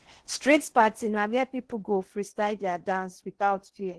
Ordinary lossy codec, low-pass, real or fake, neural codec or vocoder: Opus, 16 kbps; 10.8 kHz; fake; codec, 16 kHz in and 24 kHz out, 0.8 kbps, FocalCodec, streaming, 65536 codes